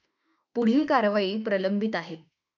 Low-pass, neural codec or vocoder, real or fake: 7.2 kHz; autoencoder, 48 kHz, 32 numbers a frame, DAC-VAE, trained on Japanese speech; fake